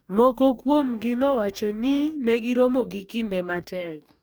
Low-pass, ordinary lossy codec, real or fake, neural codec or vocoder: none; none; fake; codec, 44.1 kHz, 2.6 kbps, DAC